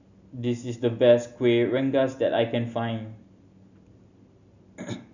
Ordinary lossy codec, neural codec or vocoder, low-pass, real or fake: none; none; 7.2 kHz; real